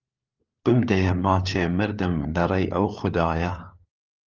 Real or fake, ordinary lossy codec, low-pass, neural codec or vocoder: fake; Opus, 24 kbps; 7.2 kHz; codec, 16 kHz, 4 kbps, FunCodec, trained on LibriTTS, 50 frames a second